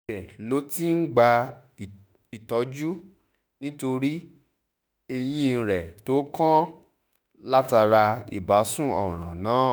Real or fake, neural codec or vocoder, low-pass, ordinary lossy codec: fake; autoencoder, 48 kHz, 32 numbers a frame, DAC-VAE, trained on Japanese speech; none; none